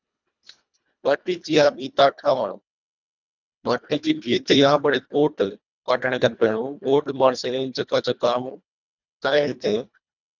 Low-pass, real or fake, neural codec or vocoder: 7.2 kHz; fake; codec, 24 kHz, 1.5 kbps, HILCodec